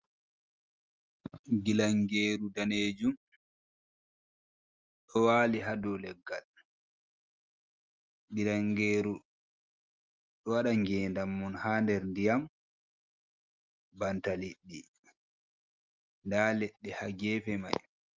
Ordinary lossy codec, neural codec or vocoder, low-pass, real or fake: Opus, 24 kbps; none; 7.2 kHz; real